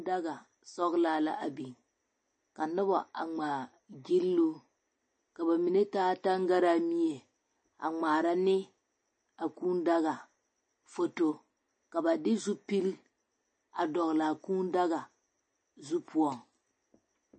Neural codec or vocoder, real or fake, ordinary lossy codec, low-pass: none; real; MP3, 32 kbps; 9.9 kHz